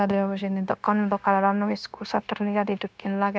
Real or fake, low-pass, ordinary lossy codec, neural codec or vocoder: fake; none; none; codec, 16 kHz, 0.9 kbps, LongCat-Audio-Codec